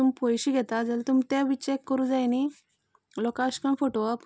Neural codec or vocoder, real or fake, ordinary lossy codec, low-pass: none; real; none; none